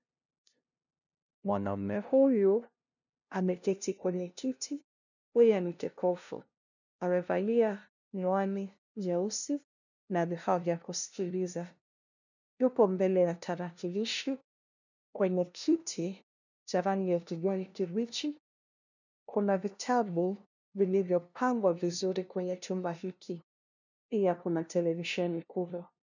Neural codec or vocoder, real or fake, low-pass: codec, 16 kHz, 0.5 kbps, FunCodec, trained on LibriTTS, 25 frames a second; fake; 7.2 kHz